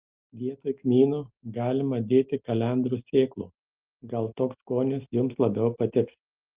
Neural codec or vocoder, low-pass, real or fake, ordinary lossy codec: none; 3.6 kHz; real; Opus, 32 kbps